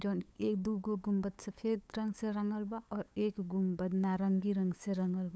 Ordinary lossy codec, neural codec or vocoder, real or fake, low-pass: none; codec, 16 kHz, 8 kbps, FunCodec, trained on LibriTTS, 25 frames a second; fake; none